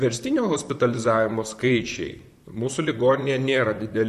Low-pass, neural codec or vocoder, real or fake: 14.4 kHz; vocoder, 44.1 kHz, 128 mel bands, Pupu-Vocoder; fake